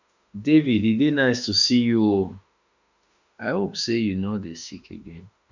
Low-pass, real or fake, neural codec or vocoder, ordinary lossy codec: 7.2 kHz; fake; autoencoder, 48 kHz, 32 numbers a frame, DAC-VAE, trained on Japanese speech; none